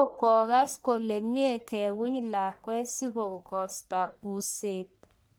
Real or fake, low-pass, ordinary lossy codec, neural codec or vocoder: fake; none; none; codec, 44.1 kHz, 1.7 kbps, Pupu-Codec